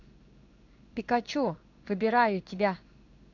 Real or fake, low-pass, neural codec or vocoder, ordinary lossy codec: fake; 7.2 kHz; codec, 16 kHz in and 24 kHz out, 1 kbps, XY-Tokenizer; none